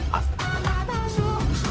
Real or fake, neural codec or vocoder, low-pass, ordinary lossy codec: fake; codec, 16 kHz, 0.4 kbps, LongCat-Audio-Codec; none; none